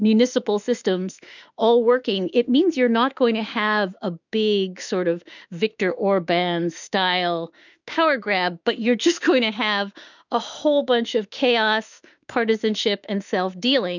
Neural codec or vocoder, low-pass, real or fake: autoencoder, 48 kHz, 32 numbers a frame, DAC-VAE, trained on Japanese speech; 7.2 kHz; fake